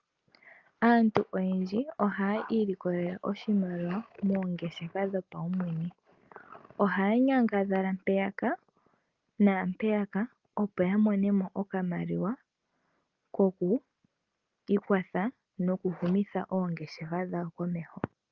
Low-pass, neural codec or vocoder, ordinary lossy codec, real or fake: 7.2 kHz; none; Opus, 24 kbps; real